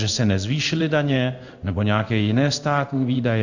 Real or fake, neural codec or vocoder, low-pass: fake; codec, 16 kHz in and 24 kHz out, 1 kbps, XY-Tokenizer; 7.2 kHz